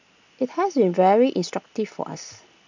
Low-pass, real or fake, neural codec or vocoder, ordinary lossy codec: 7.2 kHz; real; none; none